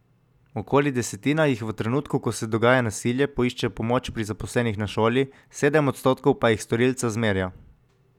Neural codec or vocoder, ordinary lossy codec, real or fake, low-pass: none; none; real; 19.8 kHz